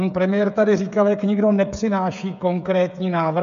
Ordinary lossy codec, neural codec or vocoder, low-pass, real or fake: MP3, 96 kbps; codec, 16 kHz, 16 kbps, FreqCodec, smaller model; 7.2 kHz; fake